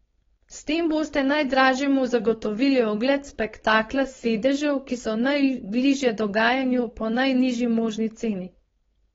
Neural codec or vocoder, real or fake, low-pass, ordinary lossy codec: codec, 16 kHz, 4.8 kbps, FACodec; fake; 7.2 kHz; AAC, 24 kbps